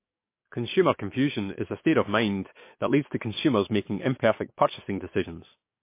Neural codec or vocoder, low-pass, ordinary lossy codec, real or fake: codec, 44.1 kHz, 7.8 kbps, DAC; 3.6 kHz; MP3, 24 kbps; fake